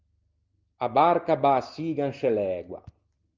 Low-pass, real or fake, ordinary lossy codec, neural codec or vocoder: 7.2 kHz; real; Opus, 32 kbps; none